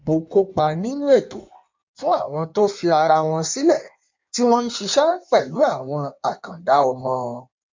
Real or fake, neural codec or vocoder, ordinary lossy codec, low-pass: fake; codec, 16 kHz in and 24 kHz out, 1.1 kbps, FireRedTTS-2 codec; MP3, 64 kbps; 7.2 kHz